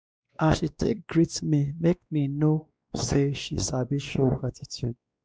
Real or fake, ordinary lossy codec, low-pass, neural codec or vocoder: fake; none; none; codec, 16 kHz, 2 kbps, X-Codec, WavLM features, trained on Multilingual LibriSpeech